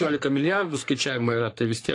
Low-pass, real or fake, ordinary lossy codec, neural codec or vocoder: 10.8 kHz; fake; AAC, 48 kbps; codec, 44.1 kHz, 3.4 kbps, Pupu-Codec